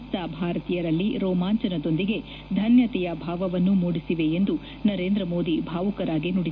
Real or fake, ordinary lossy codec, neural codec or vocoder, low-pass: real; none; none; 7.2 kHz